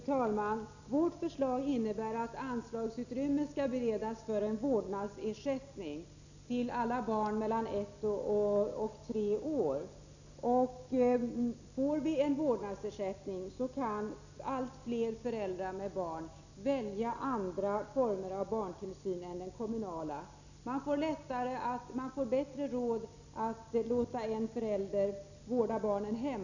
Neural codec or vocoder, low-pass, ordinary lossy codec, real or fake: none; 7.2 kHz; none; real